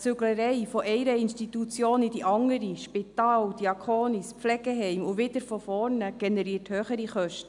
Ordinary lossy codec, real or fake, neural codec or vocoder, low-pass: MP3, 96 kbps; real; none; 10.8 kHz